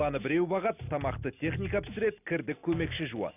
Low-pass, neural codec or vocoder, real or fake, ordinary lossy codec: 3.6 kHz; none; real; none